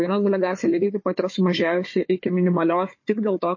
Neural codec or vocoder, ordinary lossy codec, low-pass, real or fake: codec, 16 kHz, 4 kbps, FunCodec, trained on Chinese and English, 50 frames a second; MP3, 32 kbps; 7.2 kHz; fake